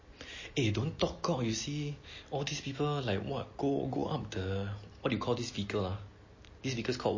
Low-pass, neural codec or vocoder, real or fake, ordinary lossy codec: 7.2 kHz; none; real; MP3, 32 kbps